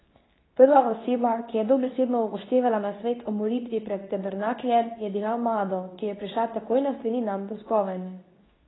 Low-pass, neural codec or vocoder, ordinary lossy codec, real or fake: 7.2 kHz; codec, 24 kHz, 0.9 kbps, WavTokenizer, medium speech release version 2; AAC, 16 kbps; fake